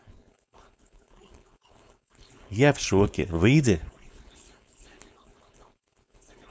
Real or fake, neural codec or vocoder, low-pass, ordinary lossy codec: fake; codec, 16 kHz, 4.8 kbps, FACodec; none; none